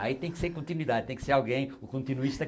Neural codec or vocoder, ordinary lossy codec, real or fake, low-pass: none; none; real; none